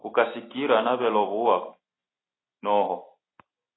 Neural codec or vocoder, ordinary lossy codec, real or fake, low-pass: none; AAC, 16 kbps; real; 7.2 kHz